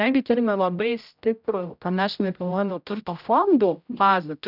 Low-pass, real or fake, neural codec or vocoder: 5.4 kHz; fake; codec, 16 kHz, 0.5 kbps, X-Codec, HuBERT features, trained on general audio